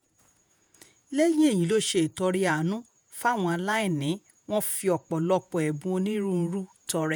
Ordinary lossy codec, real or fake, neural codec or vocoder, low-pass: none; fake; vocoder, 48 kHz, 128 mel bands, Vocos; none